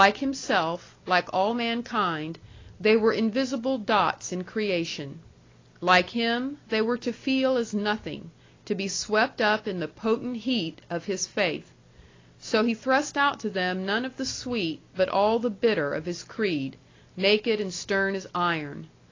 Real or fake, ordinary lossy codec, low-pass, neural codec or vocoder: real; AAC, 32 kbps; 7.2 kHz; none